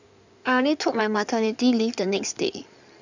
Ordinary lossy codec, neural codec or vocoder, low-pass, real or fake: none; codec, 16 kHz in and 24 kHz out, 2.2 kbps, FireRedTTS-2 codec; 7.2 kHz; fake